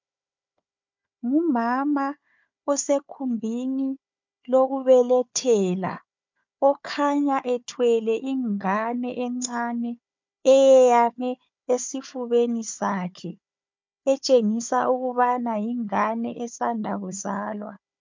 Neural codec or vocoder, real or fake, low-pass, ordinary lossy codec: codec, 16 kHz, 4 kbps, FunCodec, trained on Chinese and English, 50 frames a second; fake; 7.2 kHz; MP3, 64 kbps